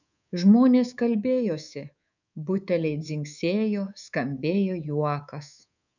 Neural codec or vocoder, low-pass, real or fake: codec, 24 kHz, 3.1 kbps, DualCodec; 7.2 kHz; fake